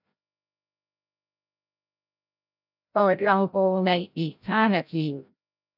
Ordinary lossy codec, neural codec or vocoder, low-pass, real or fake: none; codec, 16 kHz, 0.5 kbps, FreqCodec, larger model; 5.4 kHz; fake